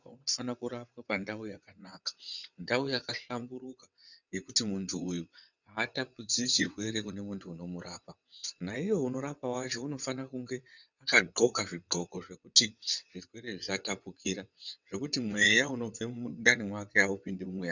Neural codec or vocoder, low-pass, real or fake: vocoder, 22.05 kHz, 80 mel bands, WaveNeXt; 7.2 kHz; fake